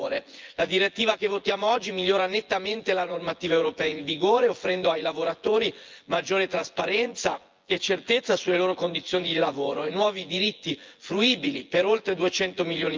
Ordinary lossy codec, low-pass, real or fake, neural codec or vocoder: Opus, 24 kbps; 7.2 kHz; fake; vocoder, 24 kHz, 100 mel bands, Vocos